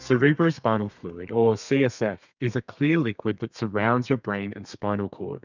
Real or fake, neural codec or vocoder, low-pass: fake; codec, 32 kHz, 1.9 kbps, SNAC; 7.2 kHz